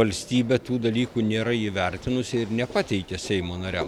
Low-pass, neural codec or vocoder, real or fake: 19.8 kHz; none; real